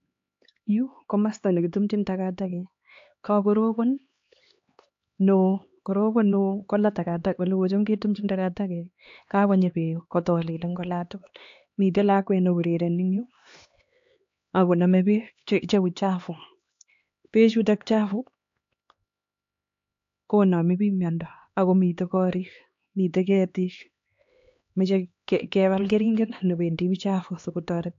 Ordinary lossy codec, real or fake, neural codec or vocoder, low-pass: AAC, 48 kbps; fake; codec, 16 kHz, 2 kbps, X-Codec, HuBERT features, trained on LibriSpeech; 7.2 kHz